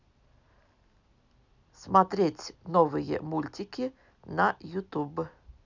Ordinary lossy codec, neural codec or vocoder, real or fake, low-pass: none; none; real; 7.2 kHz